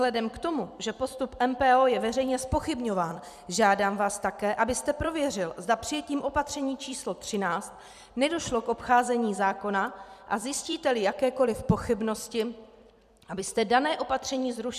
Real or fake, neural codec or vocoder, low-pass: fake; vocoder, 44.1 kHz, 128 mel bands every 256 samples, BigVGAN v2; 14.4 kHz